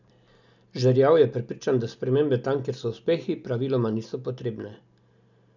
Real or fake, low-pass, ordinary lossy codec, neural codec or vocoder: real; 7.2 kHz; none; none